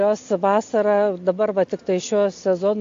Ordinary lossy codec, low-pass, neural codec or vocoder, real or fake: AAC, 48 kbps; 7.2 kHz; none; real